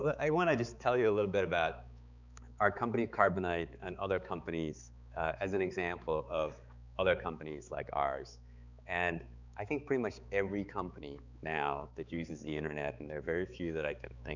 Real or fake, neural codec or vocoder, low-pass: fake; codec, 16 kHz, 4 kbps, X-Codec, HuBERT features, trained on balanced general audio; 7.2 kHz